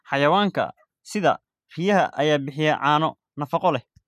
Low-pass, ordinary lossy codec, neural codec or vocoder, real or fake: 14.4 kHz; none; none; real